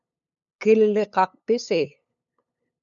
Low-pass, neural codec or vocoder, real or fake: 7.2 kHz; codec, 16 kHz, 8 kbps, FunCodec, trained on LibriTTS, 25 frames a second; fake